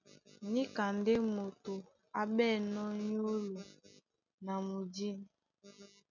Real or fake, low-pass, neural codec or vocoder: real; 7.2 kHz; none